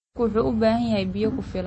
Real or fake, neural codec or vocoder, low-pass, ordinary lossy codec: real; none; 9.9 kHz; MP3, 32 kbps